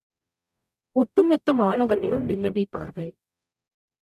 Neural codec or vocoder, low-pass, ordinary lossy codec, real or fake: codec, 44.1 kHz, 0.9 kbps, DAC; 14.4 kHz; none; fake